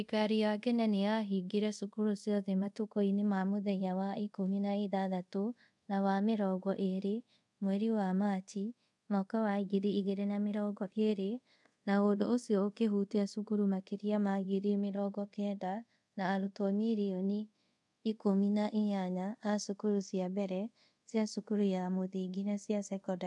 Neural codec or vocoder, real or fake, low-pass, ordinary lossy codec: codec, 24 kHz, 0.5 kbps, DualCodec; fake; 10.8 kHz; none